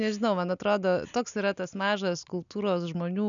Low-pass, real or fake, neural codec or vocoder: 7.2 kHz; real; none